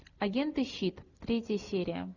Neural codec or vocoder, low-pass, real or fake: none; 7.2 kHz; real